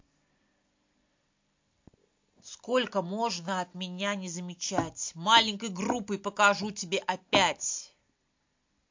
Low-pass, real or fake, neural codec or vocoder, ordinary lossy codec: 7.2 kHz; real; none; MP3, 48 kbps